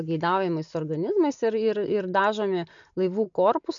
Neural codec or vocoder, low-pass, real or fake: none; 7.2 kHz; real